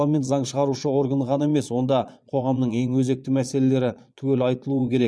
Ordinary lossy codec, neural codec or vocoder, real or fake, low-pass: none; vocoder, 22.05 kHz, 80 mel bands, Vocos; fake; none